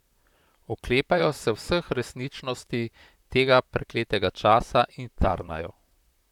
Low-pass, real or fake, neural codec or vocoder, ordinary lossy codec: 19.8 kHz; fake; vocoder, 44.1 kHz, 128 mel bands, Pupu-Vocoder; none